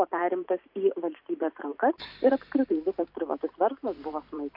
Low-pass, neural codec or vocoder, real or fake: 5.4 kHz; vocoder, 44.1 kHz, 128 mel bands every 512 samples, BigVGAN v2; fake